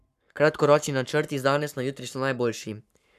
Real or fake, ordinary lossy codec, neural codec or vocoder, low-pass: fake; none; codec, 44.1 kHz, 7.8 kbps, Pupu-Codec; 14.4 kHz